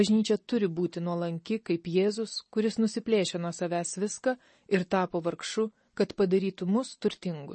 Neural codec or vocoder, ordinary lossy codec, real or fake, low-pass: none; MP3, 32 kbps; real; 10.8 kHz